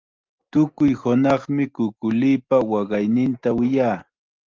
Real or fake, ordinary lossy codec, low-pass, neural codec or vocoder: real; Opus, 32 kbps; 7.2 kHz; none